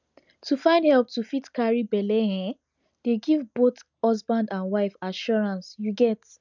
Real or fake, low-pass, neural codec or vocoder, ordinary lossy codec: real; 7.2 kHz; none; none